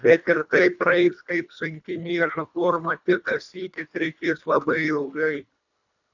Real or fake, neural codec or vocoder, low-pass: fake; codec, 24 kHz, 1.5 kbps, HILCodec; 7.2 kHz